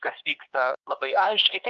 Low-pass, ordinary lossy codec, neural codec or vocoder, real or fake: 7.2 kHz; Opus, 24 kbps; codec, 16 kHz, 2 kbps, X-Codec, HuBERT features, trained on LibriSpeech; fake